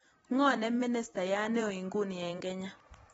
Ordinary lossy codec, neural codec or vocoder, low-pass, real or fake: AAC, 24 kbps; vocoder, 44.1 kHz, 128 mel bands every 256 samples, BigVGAN v2; 19.8 kHz; fake